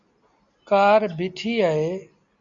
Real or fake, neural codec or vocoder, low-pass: real; none; 7.2 kHz